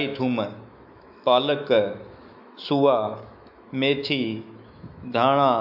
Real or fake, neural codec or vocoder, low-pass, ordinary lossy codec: fake; autoencoder, 48 kHz, 128 numbers a frame, DAC-VAE, trained on Japanese speech; 5.4 kHz; AAC, 48 kbps